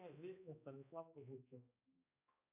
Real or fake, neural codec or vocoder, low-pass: fake; codec, 16 kHz, 1 kbps, X-Codec, HuBERT features, trained on balanced general audio; 3.6 kHz